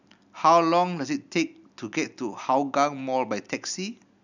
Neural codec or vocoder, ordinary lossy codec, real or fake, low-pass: none; none; real; 7.2 kHz